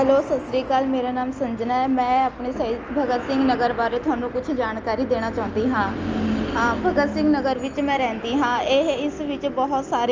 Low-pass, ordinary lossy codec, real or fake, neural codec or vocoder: 7.2 kHz; Opus, 24 kbps; real; none